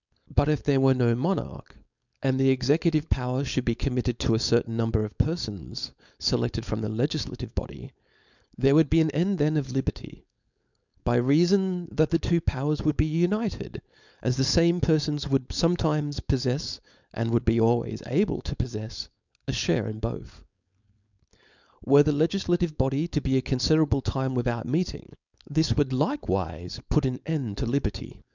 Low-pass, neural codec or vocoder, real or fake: 7.2 kHz; codec, 16 kHz, 4.8 kbps, FACodec; fake